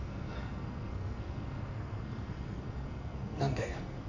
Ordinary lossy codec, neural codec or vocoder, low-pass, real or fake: none; codec, 44.1 kHz, 2.6 kbps, SNAC; 7.2 kHz; fake